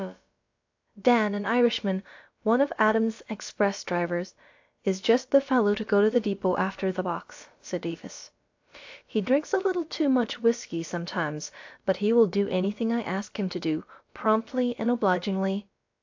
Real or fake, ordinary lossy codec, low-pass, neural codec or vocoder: fake; AAC, 48 kbps; 7.2 kHz; codec, 16 kHz, about 1 kbps, DyCAST, with the encoder's durations